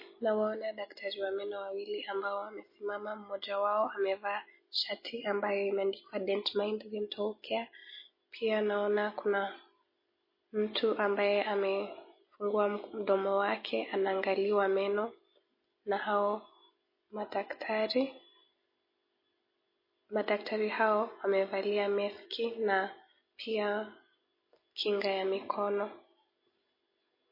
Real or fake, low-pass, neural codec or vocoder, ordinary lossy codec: real; 5.4 kHz; none; MP3, 24 kbps